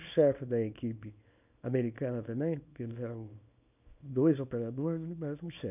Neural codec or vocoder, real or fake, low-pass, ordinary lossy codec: codec, 24 kHz, 0.9 kbps, WavTokenizer, small release; fake; 3.6 kHz; none